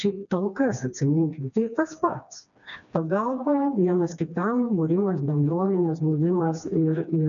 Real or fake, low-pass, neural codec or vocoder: fake; 7.2 kHz; codec, 16 kHz, 2 kbps, FreqCodec, smaller model